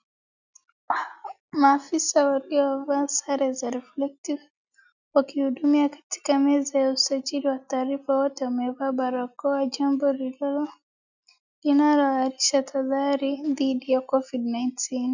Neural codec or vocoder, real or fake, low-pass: none; real; 7.2 kHz